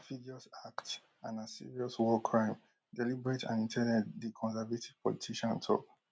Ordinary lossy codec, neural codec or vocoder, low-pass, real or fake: none; none; none; real